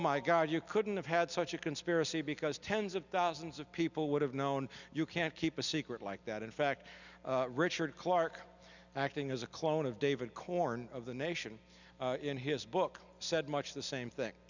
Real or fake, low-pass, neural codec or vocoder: real; 7.2 kHz; none